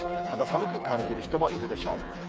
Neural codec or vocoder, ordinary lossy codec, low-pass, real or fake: codec, 16 kHz, 4 kbps, FreqCodec, smaller model; none; none; fake